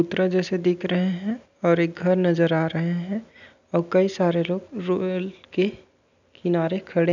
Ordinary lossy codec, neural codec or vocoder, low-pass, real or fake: none; none; 7.2 kHz; real